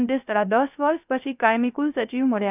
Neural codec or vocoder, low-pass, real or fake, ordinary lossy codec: codec, 16 kHz, 0.3 kbps, FocalCodec; 3.6 kHz; fake; none